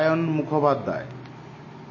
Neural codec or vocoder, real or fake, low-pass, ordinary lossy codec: none; real; 7.2 kHz; MP3, 32 kbps